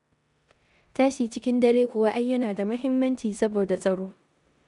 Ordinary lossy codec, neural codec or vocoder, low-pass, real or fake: none; codec, 16 kHz in and 24 kHz out, 0.9 kbps, LongCat-Audio-Codec, four codebook decoder; 10.8 kHz; fake